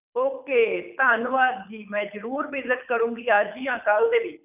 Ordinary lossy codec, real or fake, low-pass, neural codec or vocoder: none; fake; 3.6 kHz; codec, 24 kHz, 6 kbps, HILCodec